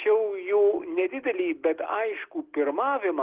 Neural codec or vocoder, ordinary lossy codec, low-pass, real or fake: none; Opus, 16 kbps; 3.6 kHz; real